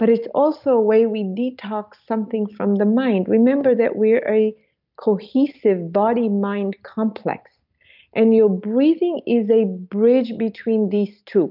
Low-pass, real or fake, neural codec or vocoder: 5.4 kHz; real; none